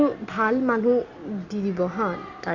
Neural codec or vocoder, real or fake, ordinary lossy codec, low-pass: none; real; Opus, 64 kbps; 7.2 kHz